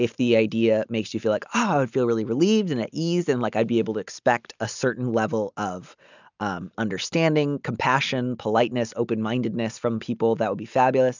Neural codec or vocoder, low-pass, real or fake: none; 7.2 kHz; real